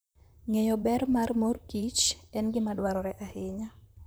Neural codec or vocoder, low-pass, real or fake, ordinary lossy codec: vocoder, 44.1 kHz, 128 mel bands, Pupu-Vocoder; none; fake; none